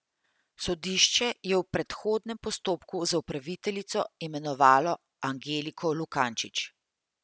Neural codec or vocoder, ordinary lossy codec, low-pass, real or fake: none; none; none; real